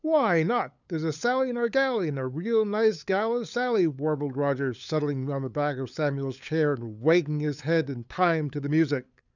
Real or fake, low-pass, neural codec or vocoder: fake; 7.2 kHz; codec, 16 kHz, 8 kbps, FunCodec, trained on LibriTTS, 25 frames a second